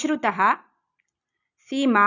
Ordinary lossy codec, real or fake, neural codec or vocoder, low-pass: none; real; none; 7.2 kHz